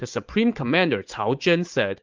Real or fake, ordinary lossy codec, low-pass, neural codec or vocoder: fake; Opus, 24 kbps; 7.2 kHz; vocoder, 44.1 kHz, 80 mel bands, Vocos